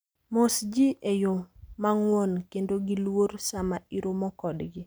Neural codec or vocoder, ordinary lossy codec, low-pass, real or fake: none; none; none; real